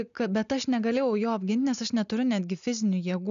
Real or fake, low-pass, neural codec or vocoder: real; 7.2 kHz; none